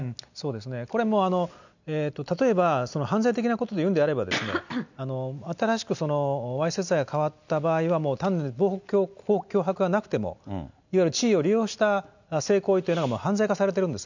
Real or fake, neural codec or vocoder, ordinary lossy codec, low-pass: real; none; none; 7.2 kHz